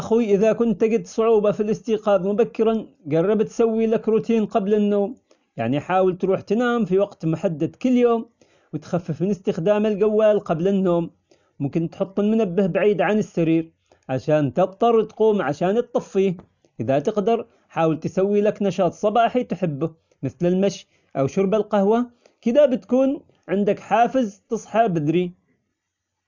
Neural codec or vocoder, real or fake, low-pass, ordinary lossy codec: none; real; 7.2 kHz; none